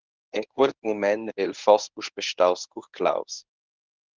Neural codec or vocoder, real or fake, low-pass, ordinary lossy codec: codec, 24 kHz, 0.9 kbps, WavTokenizer, medium speech release version 1; fake; 7.2 kHz; Opus, 24 kbps